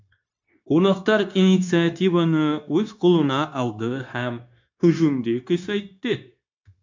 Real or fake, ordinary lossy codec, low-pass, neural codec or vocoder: fake; MP3, 64 kbps; 7.2 kHz; codec, 16 kHz, 0.9 kbps, LongCat-Audio-Codec